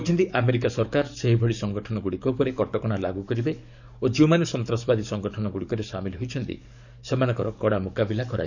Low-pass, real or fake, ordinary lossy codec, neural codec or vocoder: 7.2 kHz; fake; none; codec, 44.1 kHz, 7.8 kbps, DAC